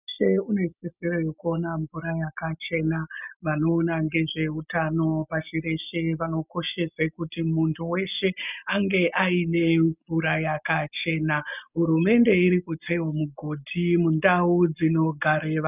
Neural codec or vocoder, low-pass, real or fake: none; 3.6 kHz; real